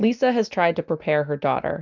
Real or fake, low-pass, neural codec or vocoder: real; 7.2 kHz; none